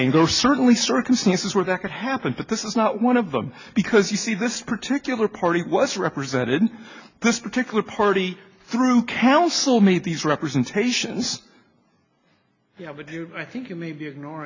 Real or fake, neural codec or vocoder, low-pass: real; none; 7.2 kHz